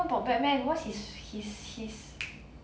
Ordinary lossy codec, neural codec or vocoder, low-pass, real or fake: none; none; none; real